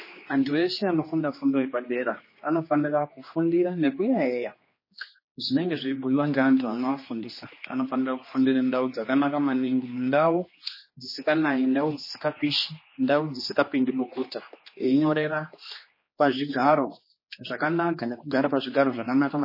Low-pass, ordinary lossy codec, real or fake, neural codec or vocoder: 5.4 kHz; MP3, 24 kbps; fake; codec, 16 kHz, 2 kbps, X-Codec, HuBERT features, trained on general audio